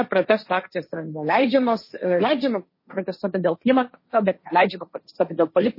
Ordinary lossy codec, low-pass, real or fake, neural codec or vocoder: MP3, 24 kbps; 5.4 kHz; fake; codec, 16 kHz, 1.1 kbps, Voila-Tokenizer